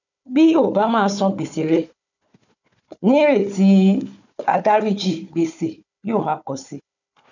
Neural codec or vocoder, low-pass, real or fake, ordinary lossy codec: codec, 16 kHz, 4 kbps, FunCodec, trained on Chinese and English, 50 frames a second; 7.2 kHz; fake; none